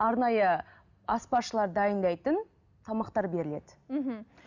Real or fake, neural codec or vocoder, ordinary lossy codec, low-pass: real; none; none; 7.2 kHz